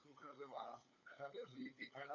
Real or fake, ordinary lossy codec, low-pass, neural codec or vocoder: fake; AAC, 48 kbps; 7.2 kHz; codec, 16 kHz, 8 kbps, FunCodec, trained on LibriTTS, 25 frames a second